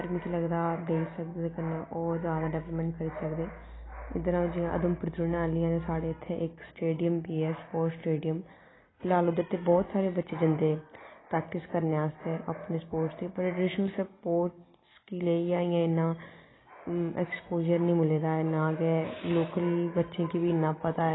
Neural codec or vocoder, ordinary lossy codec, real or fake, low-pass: none; AAC, 16 kbps; real; 7.2 kHz